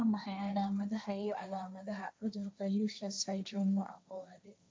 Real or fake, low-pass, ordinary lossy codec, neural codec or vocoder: fake; none; none; codec, 16 kHz, 1.1 kbps, Voila-Tokenizer